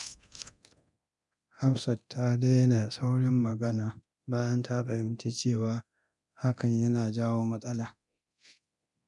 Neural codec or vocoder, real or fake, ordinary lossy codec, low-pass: codec, 24 kHz, 0.9 kbps, DualCodec; fake; none; 10.8 kHz